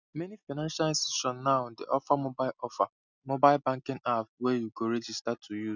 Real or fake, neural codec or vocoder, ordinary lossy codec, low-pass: real; none; none; 7.2 kHz